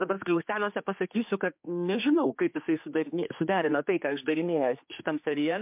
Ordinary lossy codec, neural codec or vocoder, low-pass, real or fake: MP3, 32 kbps; codec, 16 kHz, 2 kbps, X-Codec, HuBERT features, trained on general audio; 3.6 kHz; fake